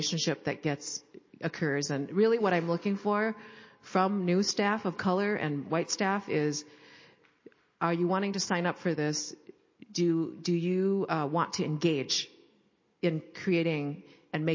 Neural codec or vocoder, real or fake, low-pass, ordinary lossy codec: none; real; 7.2 kHz; MP3, 32 kbps